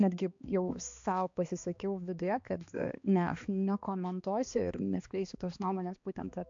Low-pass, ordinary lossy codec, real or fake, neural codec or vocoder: 7.2 kHz; AAC, 64 kbps; fake; codec, 16 kHz, 2 kbps, X-Codec, HuBERT features, trained on balanced general audio